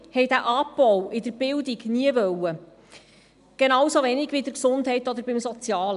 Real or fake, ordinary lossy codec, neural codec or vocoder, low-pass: real; none; none; 10.8 kHz